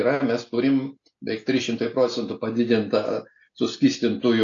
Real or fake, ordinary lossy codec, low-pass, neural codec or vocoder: real; AAC, 48 kbps; 7.2 kHz; none